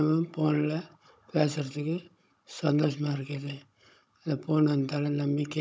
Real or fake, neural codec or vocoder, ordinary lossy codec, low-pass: fake; codec, 16 kHz, 16 kbps, FunCodec, trained on Chinese and English, 50 frames a second; none; none